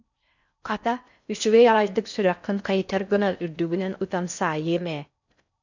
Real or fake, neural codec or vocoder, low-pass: fake; codec, 16 kHz in and 24 kHz out, 0.6 kbps, FocalCodec, streaming, 4096 codes; 7.2 kHz